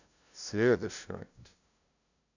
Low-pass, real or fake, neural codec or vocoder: 7.2 kHz; fake; codec, 16 kHz, 0.5 kbps, FunCodec, trained on LibriTTS, 25 frames a second